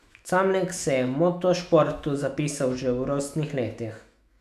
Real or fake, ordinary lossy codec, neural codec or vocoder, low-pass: fake; none; autoencoder, 48 kHz, 128 numbers a frame, DAC-VAE, trained on Japanese speech; 14.4 kHz